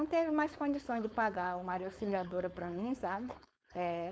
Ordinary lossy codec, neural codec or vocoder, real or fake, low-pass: none; codec, 16 kHz, 4.8 kbps, FACodec; fake; none